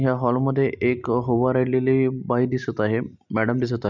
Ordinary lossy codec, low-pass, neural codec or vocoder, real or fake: none; 7.2 kHz; none; real